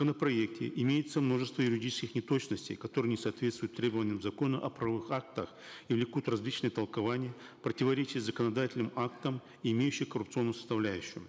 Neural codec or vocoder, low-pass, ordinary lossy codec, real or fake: none; none; none; real